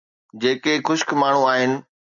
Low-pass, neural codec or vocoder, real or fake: 7.2 kHz; none; real